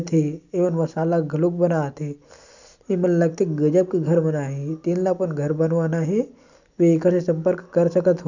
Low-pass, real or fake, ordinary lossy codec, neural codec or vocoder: 7.2 kHz; real; none; none